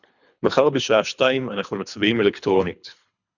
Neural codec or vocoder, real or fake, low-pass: codec, 24 kHz, 3 kbps, HILCodec; fake; 7.2 kHz